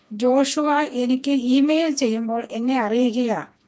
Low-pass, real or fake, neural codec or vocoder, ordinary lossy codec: none; fake; codec, 16 kHz, 2 kbps, FreqCodec, smaller model; none